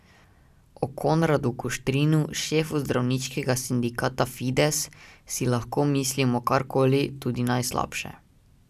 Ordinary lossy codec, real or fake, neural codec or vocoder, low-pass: none; real; none; 14.4 kHz